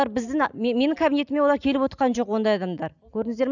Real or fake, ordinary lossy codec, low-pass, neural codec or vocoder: real; none; 7.2 kHz; none